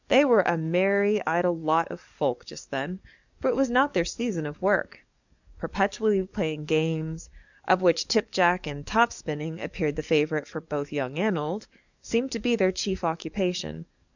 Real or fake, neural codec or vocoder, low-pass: fake; codec, 44.1 kHz, 7.8 kbps, DAC; 7.2 kHz